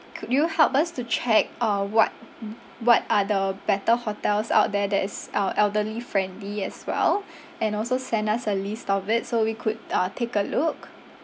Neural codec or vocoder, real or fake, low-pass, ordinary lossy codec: none; real; none; none